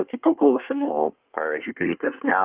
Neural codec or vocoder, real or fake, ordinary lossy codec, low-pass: codec, 24 kHz, 1 kbps, SNAC; fake; Opus, 32 kbps; 3.6 kHz